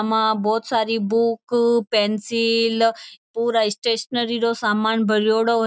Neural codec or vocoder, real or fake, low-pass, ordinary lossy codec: none; real; none; none